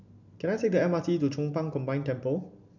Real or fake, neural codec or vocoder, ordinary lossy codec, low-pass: real; none; none; 7.2 kHz